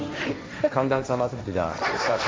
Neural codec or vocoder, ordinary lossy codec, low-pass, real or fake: codec, 16 kHz, 1.1 kbps, Voila-Tokenizer; none; none; fake